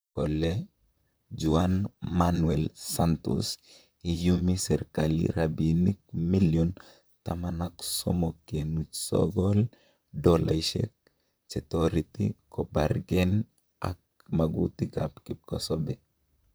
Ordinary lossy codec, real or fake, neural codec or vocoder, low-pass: none; fake; vocoder, 44.1 kHz, 128 mel bands, Pupu-Vocoder; none